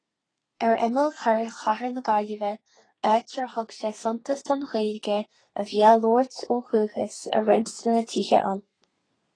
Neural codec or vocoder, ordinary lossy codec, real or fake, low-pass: codec, 32 kHz, 1.9 kbps, SNAC; AAC, 32 kbps; fake; 9.9 kHz